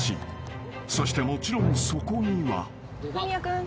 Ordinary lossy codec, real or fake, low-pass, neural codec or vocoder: none; real; none; none